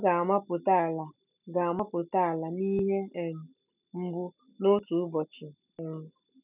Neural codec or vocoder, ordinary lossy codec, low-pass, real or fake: none; none; 3.6 kHz; real